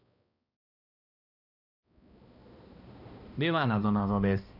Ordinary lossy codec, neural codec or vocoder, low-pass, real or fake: none; codec, 16 kHz, 1 kbps, X-Codec, HuBERT features, trained on balanced general audio; 5.4 kHz; fake